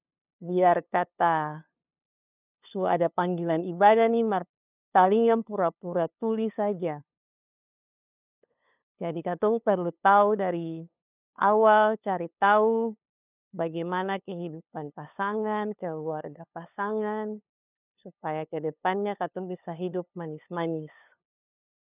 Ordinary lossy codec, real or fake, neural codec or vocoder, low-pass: none; fake; codec, 16 kHz, 2 kbps, FunCodec, trained on LibriTTS, 25 frames a second; 3.6 kHz